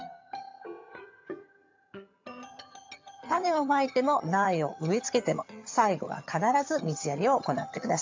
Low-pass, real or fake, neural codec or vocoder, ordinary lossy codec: 7.2 kHz; fake; vocoder, 22.05 kHz, 80 mel bands, HiFi-GAN; MP3, 64 kbps